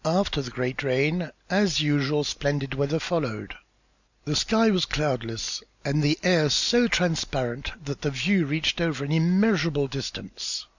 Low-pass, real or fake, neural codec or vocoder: 7.2 kHz; real; none